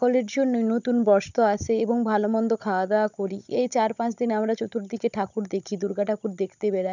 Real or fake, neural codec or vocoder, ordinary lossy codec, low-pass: fake; codec, 16 kHz, 16 kbps, FunCodec, trained on Chinese and English, 50 frames a second; none; 7.2 kHz